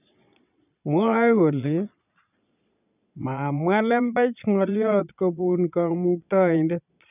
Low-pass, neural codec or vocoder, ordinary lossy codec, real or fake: 3.6 kHz; vocoder, 22.05 kHz, 80 mel bands, Vocos; none; fake